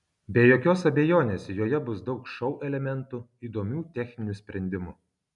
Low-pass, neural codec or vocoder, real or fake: 10.8 kHz; none; real